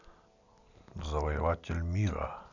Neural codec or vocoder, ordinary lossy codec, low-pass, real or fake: none; none; 7.2 kHz; real